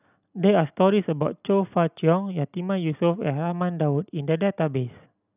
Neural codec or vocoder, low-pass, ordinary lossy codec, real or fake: none; 3.6 kHz; none; real